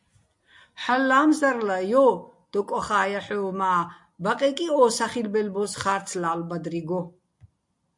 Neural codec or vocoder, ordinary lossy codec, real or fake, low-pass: none; MP3, 64 kbps; real; 10.8 kHz